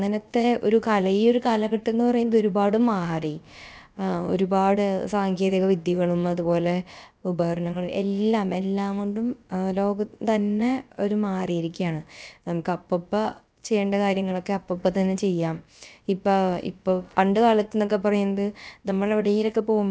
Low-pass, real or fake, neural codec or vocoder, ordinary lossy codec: none; fake; codec, 16 kHz, about 1 kbps, DyCAST, with the encoder's durations; none